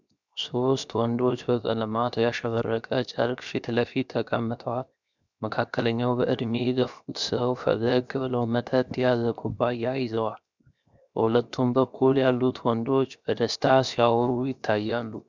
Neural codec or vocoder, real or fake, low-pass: codec, 16 kHz, 0.7 kbps, FocalCodec; fake; 7.2 kHz